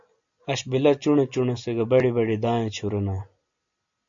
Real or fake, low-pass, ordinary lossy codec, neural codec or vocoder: real; 7.2 kHz; AAC, 64 kbps; none